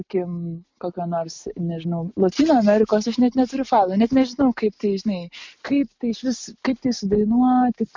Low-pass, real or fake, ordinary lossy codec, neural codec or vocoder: 7.2 kHz; real; MP3, 64 kbps; none